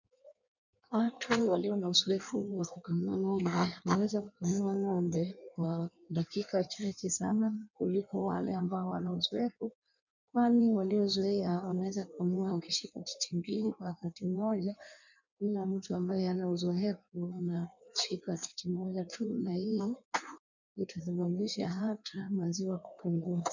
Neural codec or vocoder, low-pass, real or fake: codec, 16 kHz in and 24 kHz out, 1.1 kbps, FireRedTTS-2 codec; 7.2 kHz; fake